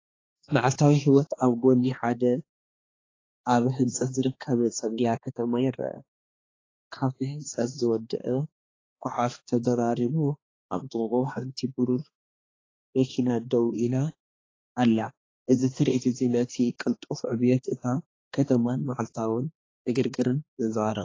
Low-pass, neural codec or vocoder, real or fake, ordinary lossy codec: 7.2 kHz; codec, 16 kHz, 2 kbps, X-Codec, HuBERT features, trained on balanced general audio; fake; AAC, 32 kbps